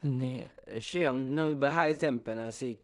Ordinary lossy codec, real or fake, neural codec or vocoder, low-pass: none; fake; codec, 16 kHz in and 24 kHz out, 0.4 kbps, LongCat-Audio-Codec, two codebook decoder; 10.8 kHz